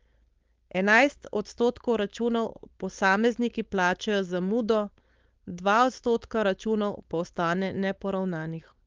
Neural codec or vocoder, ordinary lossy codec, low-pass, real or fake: codec, 16 kHz, 4.8 kbps, FACodec; Opus, 32 kbps; 7.2 kHz; fake